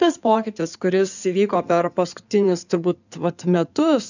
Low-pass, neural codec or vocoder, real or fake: 7.2 kHz; codec, 16 kHz in and 24 kHz out, 2.2 kbps, FireRedTTS-2 codec; fake